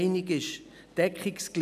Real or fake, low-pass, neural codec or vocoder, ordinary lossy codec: real; 14.4 kHz; none; none